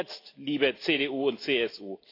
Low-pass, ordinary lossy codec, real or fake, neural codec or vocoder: 5.4 kHz; AAC, 32 kbps; real; none